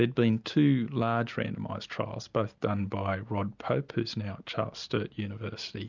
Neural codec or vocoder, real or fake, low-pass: none; real; 7.2 kHz